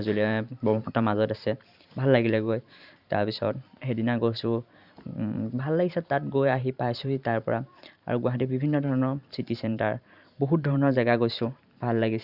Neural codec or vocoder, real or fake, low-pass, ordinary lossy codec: vocoder, 44.1 kHz, 128 mel bands every 512 samples, BigVGAN v2; fake; 5.4 kHz; Opus, 64 kbps